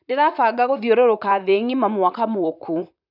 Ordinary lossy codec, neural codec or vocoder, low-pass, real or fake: none; vocoder, 44.1 kHz, 128 mel bands, Pupu-Vocoder; 5.4 kHz; fake